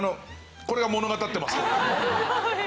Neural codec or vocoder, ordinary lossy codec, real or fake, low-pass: none; none; real; none